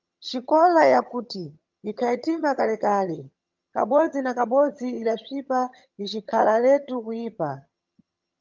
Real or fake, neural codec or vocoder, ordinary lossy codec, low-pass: fake; vocoder, 22.05 kHz, 80 mel bands, HiFi-GAN; Opus, 24 kbps; 7.2 kHz